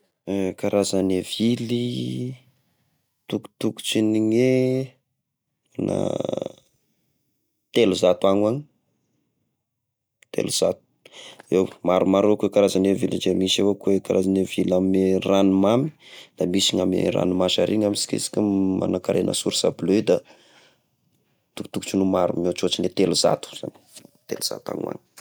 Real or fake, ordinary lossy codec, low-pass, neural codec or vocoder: real; none; none; none